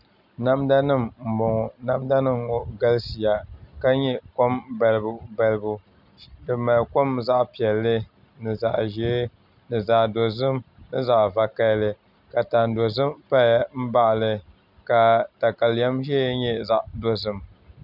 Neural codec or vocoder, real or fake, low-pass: none; real; 5.4 kHz